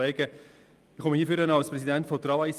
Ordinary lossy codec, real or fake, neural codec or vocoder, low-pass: Opus, 32 kbps; real; none; 14.4 kHz